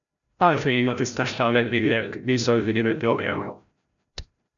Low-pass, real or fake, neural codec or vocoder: 7.2 kHz; fake; codec, 16 kHz, 0.5 kbps, FreqCodec, larger model